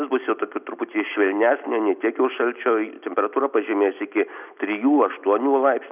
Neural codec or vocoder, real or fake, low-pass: none; real; 3.6 kHz